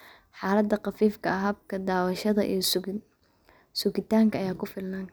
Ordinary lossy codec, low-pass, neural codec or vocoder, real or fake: none; none; none; real